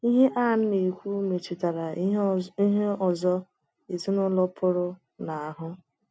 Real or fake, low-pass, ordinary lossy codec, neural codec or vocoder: real; none; none; none